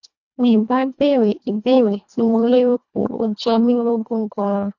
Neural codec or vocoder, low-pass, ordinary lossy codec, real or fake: codec, 24 kHz, 1.5 kbps, HILCodec; 7.2 kHz; none; fake